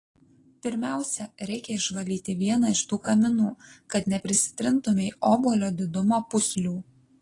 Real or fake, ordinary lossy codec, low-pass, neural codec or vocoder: real; AAC, 32 kbps; 10.8 kHz; none